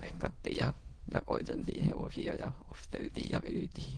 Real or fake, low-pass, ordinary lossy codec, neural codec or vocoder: fake; 9.9 kHz; Opus, 16 kbps; autoencoder, 22.05 kHz, a latent of 192 numbers a frame, VITS, trained on many speakers